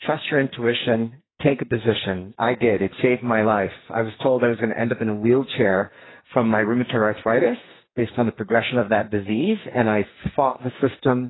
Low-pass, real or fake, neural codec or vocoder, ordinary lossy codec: 7.2 kHz; fake; codec, 44.1 kHz, 2.6 kbps, SNAC; AAC, 16 kbps